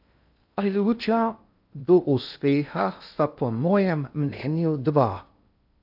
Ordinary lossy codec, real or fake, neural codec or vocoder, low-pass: AAC, 48 kbps; fake; codec, 16 kHz in and 24 kHz out, 0.6 kbps, FocalCodec, streaming, 2048 codes; 5.4 kHz